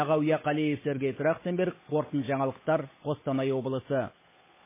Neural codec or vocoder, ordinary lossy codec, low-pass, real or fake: codec, 16 kHz, 8 kbps, FunCodec, trained on Chinese and English, 25 frames a second; MP3, 16 kbps; 3.6 kHz; fake